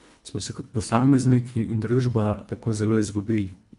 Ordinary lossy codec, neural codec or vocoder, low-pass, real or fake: AAC, 64 kbps; codec, 24 kHz, 1.5 kbps, HILCodec; 10.8 kHz; fake